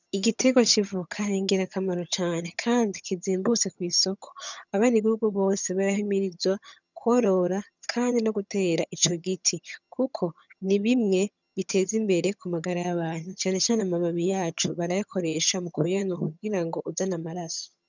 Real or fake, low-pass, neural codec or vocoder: fake; 7.2 kHz; vocoder, 22.05 kHz, 80 mel bands, HiFi-GAN